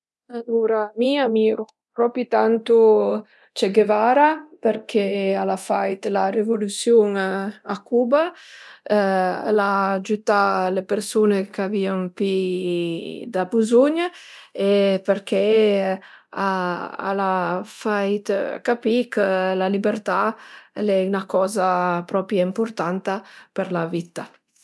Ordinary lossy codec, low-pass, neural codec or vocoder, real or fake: none; none; codec, 24 kHz, 0.9 kbps, DualCodec; fake